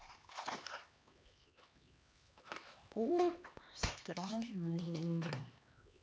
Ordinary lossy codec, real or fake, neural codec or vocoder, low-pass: none; fake; codec, 16 kHz, 2 kbps, X-Codec, HuBERT features, trained on LibriSpeech; none